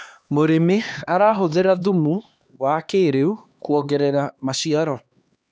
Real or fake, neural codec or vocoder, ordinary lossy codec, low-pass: fake; codec, 16 kHz, 2 kbps, X-Codec, HuBERT features, trained on LibriSpeech; none; none